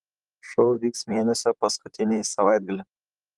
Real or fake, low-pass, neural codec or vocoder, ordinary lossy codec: fake; 10.8 kHz; vocoder, 44.1 kHz, 128 mel bands, Pupu-Vocoder; Opus, 24 kbps